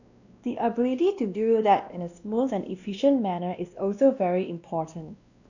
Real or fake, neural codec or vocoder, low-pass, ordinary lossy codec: fake; codec, 16 kHz, 1 kbps, X-Codec, WavLM features, trained on Multilingual LibriSpeech; 7.2 kHz; none